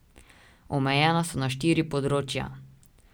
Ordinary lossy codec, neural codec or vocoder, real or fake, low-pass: none; none; real; none